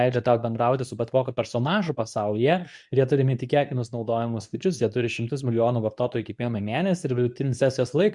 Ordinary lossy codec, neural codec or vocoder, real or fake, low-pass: MP3, 96 kbps; codec, 24 kHz, 0.9 kbps, WavTokenizer, medium speech release version 2; fake; 10.8 kHz